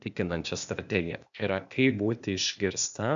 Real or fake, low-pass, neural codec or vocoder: fake; 7.2 kHz; codec, 16 kHz, 0.8 kbps, ZipCodec